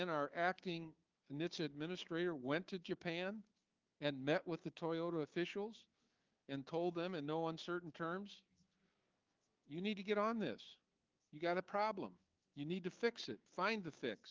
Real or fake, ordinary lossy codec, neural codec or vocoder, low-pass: fake; Opus, 32 kbps; codec, 16 kHz, 6 kbps, DAC; 7.2 kHz